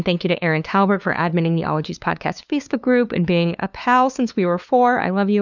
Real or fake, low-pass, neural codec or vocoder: fake; 7.2 kHz; codec, 16 kHz, 2 kbps, FunCodec, trained on LibriTTS, 25 frames a second